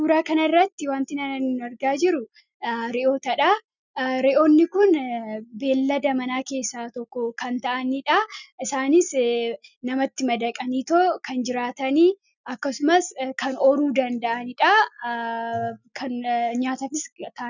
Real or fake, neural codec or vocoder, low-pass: real; none; 7.2 kHz